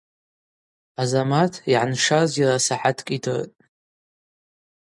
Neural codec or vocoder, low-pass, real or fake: none; 10.8 kHz; real